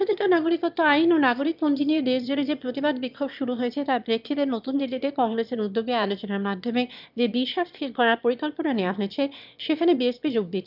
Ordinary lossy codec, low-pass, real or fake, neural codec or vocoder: none; 5.4 kHz; fake; autoencoder, 22.05 kHz, a latent of 192 numbers a frame, VITS, trained on one speaker